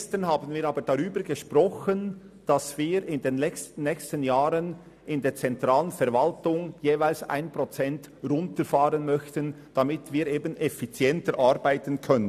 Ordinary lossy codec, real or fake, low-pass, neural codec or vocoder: none; real; 14.4 kHz; none